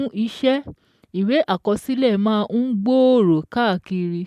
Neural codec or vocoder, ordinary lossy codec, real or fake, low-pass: none; MP3, 96 kbps; real; 14.4 kHz